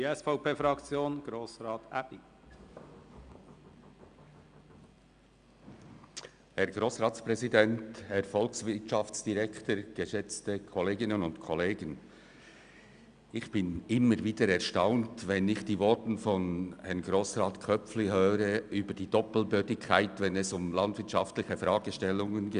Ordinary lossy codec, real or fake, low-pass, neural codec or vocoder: Opus, 64 kbps; real; 9.9 kHz; none